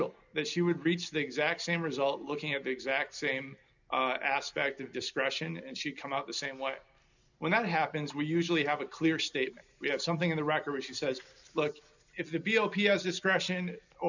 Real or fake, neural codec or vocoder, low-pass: real; none; 7.2 kHz